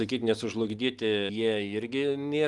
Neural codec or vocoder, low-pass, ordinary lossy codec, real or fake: none; 10.8 kHz; Opus, 32 kbps; real